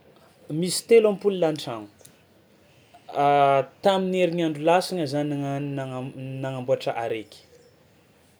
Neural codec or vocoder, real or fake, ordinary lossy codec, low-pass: none; real; none; none